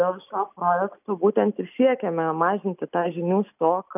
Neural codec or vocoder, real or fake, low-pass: none; real; 3.6 kHz